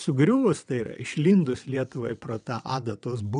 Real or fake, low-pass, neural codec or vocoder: fake; 9.9 kHz; vocoder, 22.05 kHz, 80 mel bands, WaveNeXt